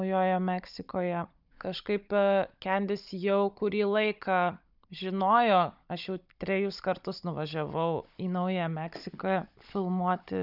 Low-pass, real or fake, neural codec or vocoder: 5.4 kHz; fake; codec, 16 kHz, 16 kbps, FunCodec, trained on Chinese and English, 50 frames a second